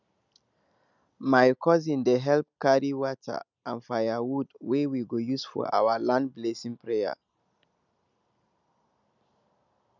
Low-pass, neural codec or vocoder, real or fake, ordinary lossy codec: 7.2 kHz; none; real; none